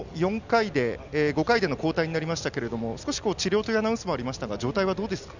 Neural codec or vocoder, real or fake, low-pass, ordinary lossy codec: none; real; 7.2 kHz; none